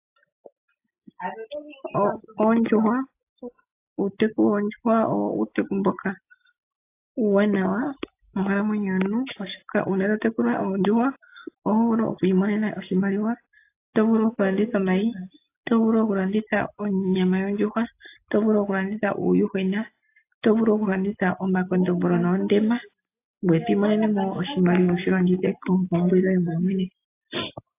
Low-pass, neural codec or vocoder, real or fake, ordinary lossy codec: 3.6 kHz; none; real; AAC, 24 kbps